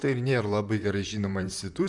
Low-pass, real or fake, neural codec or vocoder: 10.8 kHz; fake; vocoder, 44.1 kHz, 128 mel bands, Pupu-Vocoder